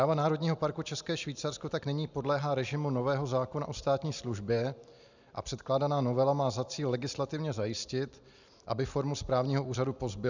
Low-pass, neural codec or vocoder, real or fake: 7.2 kHz; none; real